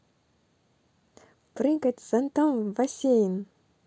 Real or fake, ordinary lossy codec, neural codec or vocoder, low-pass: real; none; none; none